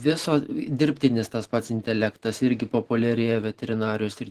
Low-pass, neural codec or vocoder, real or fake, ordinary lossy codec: 14.4 kHz; vocoder, 44.1 kHz, 128 mel bands every 512 samples, BigVGAN v2; fake; Opus, 16 kbps